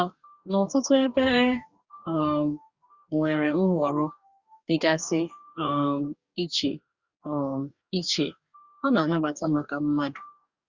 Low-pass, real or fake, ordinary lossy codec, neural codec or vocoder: 7.2 kHz; fake; Opus, 64 kbps; codec, 44.1 kHz, 2.6 kbps, DAC